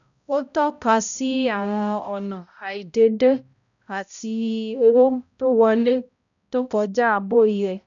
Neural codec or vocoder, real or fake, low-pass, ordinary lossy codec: codec, 16 kHz, 0.5 kbps, X-Codec, HuBERT features, trained on balanced general audio; fake; 7.2 kHz; none